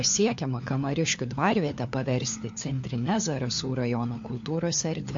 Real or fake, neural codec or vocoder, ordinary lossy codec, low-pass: fake; codec, 16 kHz, 4 kbps, FunCodec, trained on LibriTTS, 50 frames a second; MP3, 48 kbps; 7.2 kHz